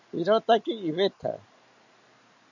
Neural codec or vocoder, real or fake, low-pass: none; real; 7.2 kHz